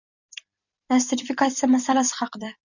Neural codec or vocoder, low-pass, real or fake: vocoder, 44.1 kHz, 128 mel bands every 256 samples, BigVGAN v2; 7.2 kHz; fake